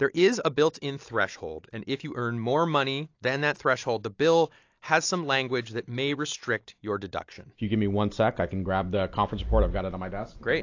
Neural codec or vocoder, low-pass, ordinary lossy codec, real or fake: none; 7.2 kHz; AAC, 48 kbps; real